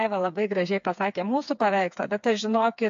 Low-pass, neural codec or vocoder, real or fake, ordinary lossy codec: 7.2 kHz; codec, 16 kHz, 4 kbps, FreqCodec, smaller model; fake; AAC, 64 kbps